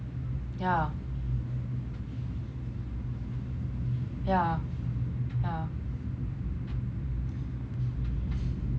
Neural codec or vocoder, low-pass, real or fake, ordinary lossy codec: none; none; real; none